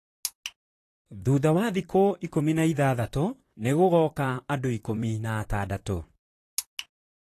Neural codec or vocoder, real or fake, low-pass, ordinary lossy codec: vocoder, 44.1 kHz, 128 mel bands, Pupu-Vocoder; fake; 14.4 kHz; AAC, 48 kbps